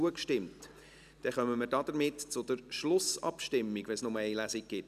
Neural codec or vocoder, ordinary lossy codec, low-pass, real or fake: none; none; 14.4 kHz; real